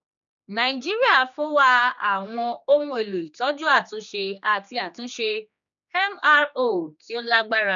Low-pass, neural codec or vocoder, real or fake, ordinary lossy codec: 7.2 kHz; codec, 16 kHz, 2 kbps, X-Codec, HuBERT features, trained on general audio; fake; none